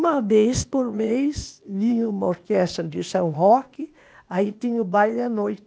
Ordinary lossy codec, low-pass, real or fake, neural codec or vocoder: none; none; fake; codec, 16 kHz, 0.8 kbps, ZipCodec